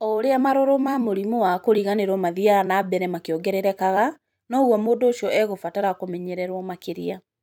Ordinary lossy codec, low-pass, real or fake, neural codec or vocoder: none; 19.8 kHz; fake; vocoder, 44.1 kHz, 128 mel bands every 256 samples, BigVGAN v2